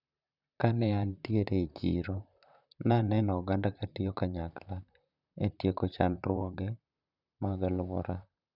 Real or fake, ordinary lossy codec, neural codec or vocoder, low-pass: fake; none; vocoder, 44.1 kHz, 128 mel bands, Pupu-Vocoder; 5.4 kHz